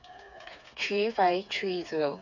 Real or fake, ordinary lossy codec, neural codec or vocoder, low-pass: fake; none; codec, 16 kHz, 4 kbps, FreqCodec, smaller model; 7.2 kHz